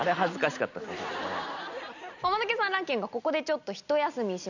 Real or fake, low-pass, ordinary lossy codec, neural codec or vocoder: real; 7.2 kHz; none; none